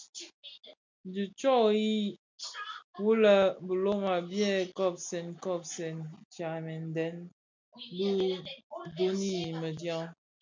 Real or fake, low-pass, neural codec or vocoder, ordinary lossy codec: real; 7.2 kHz; none; MP3, 64 kbps